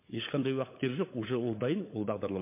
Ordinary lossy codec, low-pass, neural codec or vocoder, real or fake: MP3, 24 kbps; 3.6 kHz; codec, 16 kHz, 16 kbps, FunCodec, trained on LibriTTS, 50 frames a second; fake